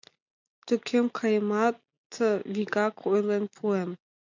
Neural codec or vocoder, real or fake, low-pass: none; real; 7.2 kHz